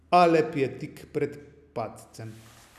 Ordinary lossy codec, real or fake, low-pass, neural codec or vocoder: none; real; 14.4 kHz; none